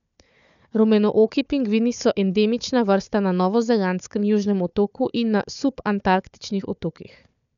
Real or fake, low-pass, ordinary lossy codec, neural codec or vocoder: fake; 7.2 kHz; none; codec, 16 kHz, 4 kbps, FunCodec, trained on Chinese and English, 50 frames a second